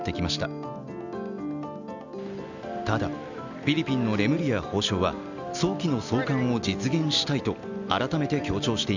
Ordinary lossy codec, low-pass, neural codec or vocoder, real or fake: none; 7.2 kHz; none; real